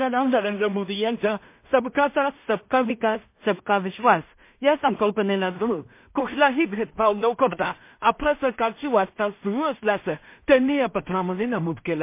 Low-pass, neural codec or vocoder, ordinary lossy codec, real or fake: 3.6 kHz; codec, 16 kHz in and 24 kHz out, 0.4 kbps, LongCat-Audio-Codec, two codebook decoder; MP3, 24 kbps; fake